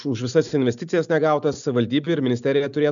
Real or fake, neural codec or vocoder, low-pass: real; none; 7.2 kHz